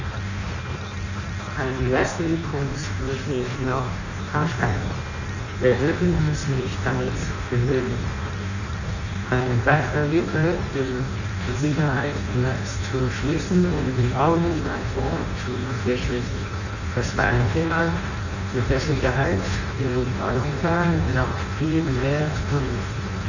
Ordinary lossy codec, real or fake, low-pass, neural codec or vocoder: none; fake; 7.2 kHz; codec, 16 kHz in and 24 kHz out, 0.6 kbps, FireRedTTS-2 codec